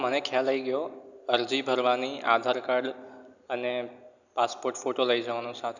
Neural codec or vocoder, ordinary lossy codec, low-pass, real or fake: none; none; 7.2 kHz; real